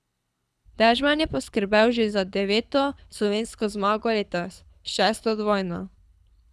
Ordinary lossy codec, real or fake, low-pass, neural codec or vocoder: none; fake; none; codec, 24 kHz, 6 kbps, HILCodec